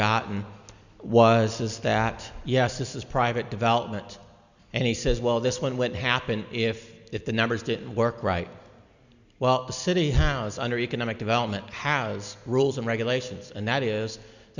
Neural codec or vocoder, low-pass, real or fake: none; 7.2 kHz; real